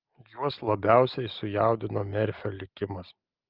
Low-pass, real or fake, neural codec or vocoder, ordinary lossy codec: 5.4 kHz; real; none; Opus, 32 kbps